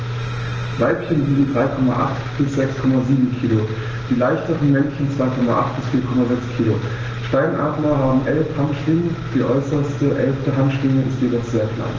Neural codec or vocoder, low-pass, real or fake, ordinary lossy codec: none; 7.2 kHz; real; Opus, 16 kbps